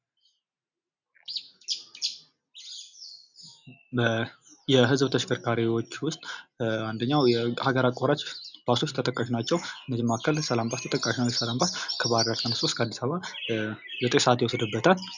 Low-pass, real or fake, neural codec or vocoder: 7.2 kHz; real; none